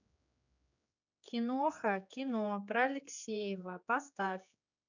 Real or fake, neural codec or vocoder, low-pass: fake; codec, 16 kHz, 4 kbps, X-Codec, HuBERT features, trained on general audio; 7.2 kHz